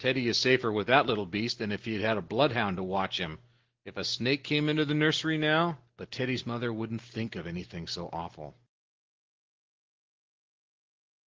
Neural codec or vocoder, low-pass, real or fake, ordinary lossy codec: none; 7.2 kHz; real; Opus, 16 kbps